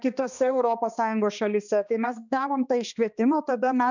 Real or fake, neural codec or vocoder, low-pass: fake; codec, 16 kHz, 2 kbps, X-Codec, HuBERT features, trained on balanced general audio; 7.2 kHz